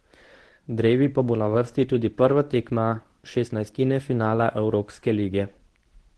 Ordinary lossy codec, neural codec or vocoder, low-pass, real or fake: Opus, 16 kbps; codec, 24 kHz, 0.9 kbps, WavTokenizer, medium speech release version 1; 10.8 kHz; fake